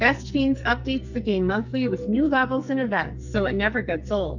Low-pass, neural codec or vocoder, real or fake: 7.2 kHz; codec, 44.1 kHz, 2.6 kbps, SNAC; fake